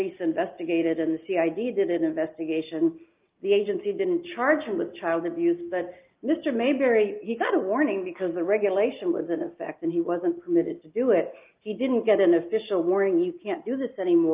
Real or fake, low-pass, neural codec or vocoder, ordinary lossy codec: real; 3.6 kHz; none; Opus, 24 kbps